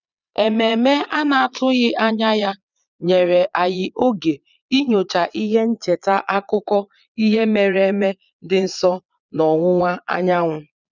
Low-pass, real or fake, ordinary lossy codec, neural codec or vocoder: 7.2 kHz; fake; none; vocoder, 22.05 kHz, 80 mel bands, Vocos